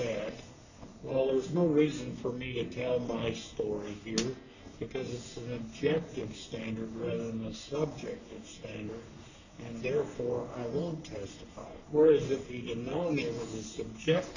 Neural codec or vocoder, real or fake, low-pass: codec, 44.1 kHz, 3.4 kbps, Pupu-Codec; fake; 7.2 kHz